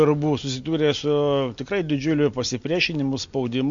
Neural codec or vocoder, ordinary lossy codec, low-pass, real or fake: none; MP3, 48 kbps; 7.2 kHz; real